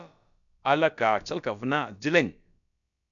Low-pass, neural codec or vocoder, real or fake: 7.2 kHz; codec, 16 kHz, about 1 kbps, DyCAST, with the encoder's durations; fake